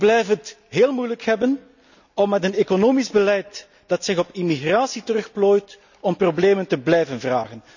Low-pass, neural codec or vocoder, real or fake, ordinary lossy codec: 7.2 kHz; none; real; none